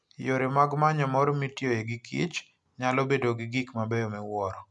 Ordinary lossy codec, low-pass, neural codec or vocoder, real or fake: MP3, 96 kbps; 10.8 kHz; none; real